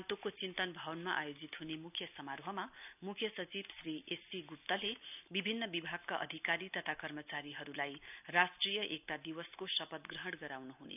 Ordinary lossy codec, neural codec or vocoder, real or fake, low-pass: none; none; real; 3.6 kHz